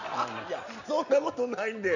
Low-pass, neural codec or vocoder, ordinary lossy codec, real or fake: 7.2 kHz; none; none; real